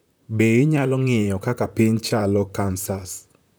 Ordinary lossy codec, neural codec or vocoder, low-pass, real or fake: none; vocoder, 44.1 kHz, 128 mel bands, Pupu-Vocoder; none; fake